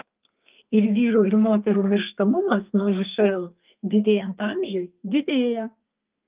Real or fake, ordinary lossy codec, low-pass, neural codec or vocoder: fake; Opus, 32 kbps; 3.6 kHz; codec, 32 kHz, 1.9 kbps, SNAC